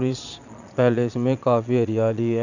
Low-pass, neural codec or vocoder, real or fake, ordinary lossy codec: 7.2 kHz; vocoder, 22.05 kHz, 80 mel bands, Vocos; fake; none